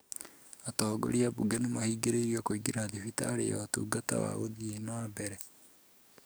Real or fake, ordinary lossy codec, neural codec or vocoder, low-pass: fake; none; codec, 44.1 kHz, 7.8 kbps, DAC; none